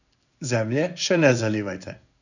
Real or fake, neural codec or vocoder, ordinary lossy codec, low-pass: fake; codec, 16 kHz in and 24 kHz out, 1 kbps, XY-Tokenizer; none; 7.2 kHz